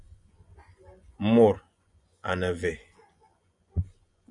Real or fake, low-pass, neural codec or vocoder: fake; 10.8 kHz; vocoder, 24 kHz, 100 mel bands, Vocos